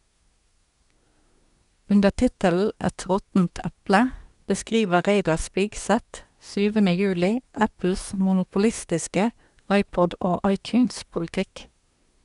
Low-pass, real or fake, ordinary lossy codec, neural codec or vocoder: 10.8 kHz; fake; none; codec, 24 kHz, 1 kbps, SNAC